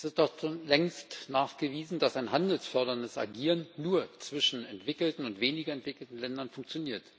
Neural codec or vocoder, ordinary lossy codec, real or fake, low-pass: none; none; real; none